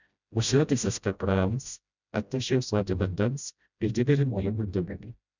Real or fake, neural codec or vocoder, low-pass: fake; codec, 16 kHz, 0.5 kbps, FreqCodec, smaller model; 7.2 kHz